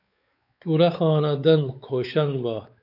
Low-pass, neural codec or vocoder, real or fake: 5.4 kHz; codec, 16 kHz, 4 kbps, X-Codec, WavLM features, trained on Multilingual LibriSpeech; fake